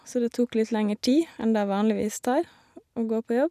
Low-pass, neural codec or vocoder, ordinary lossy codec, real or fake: 14.4 kHz; none; none; real